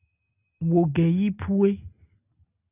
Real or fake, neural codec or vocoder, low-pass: real; none; 3.6 kHz